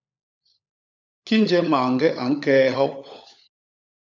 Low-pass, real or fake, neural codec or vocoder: 7.2 kHz; fake; codec, 16 kHz, 16 kbps, FunCodec, trained on LibriTTS, 50 frames a second